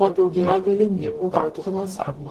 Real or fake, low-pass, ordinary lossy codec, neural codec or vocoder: fake; 14.4 kHz; Opus, 16 kbps; codec, 44.1 kHz, 0.9 kbps, DAC